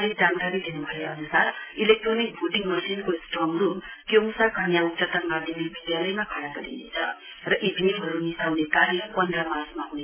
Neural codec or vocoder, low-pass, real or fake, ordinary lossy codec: none; 3.6 kHz; real; MP3, 16 kbps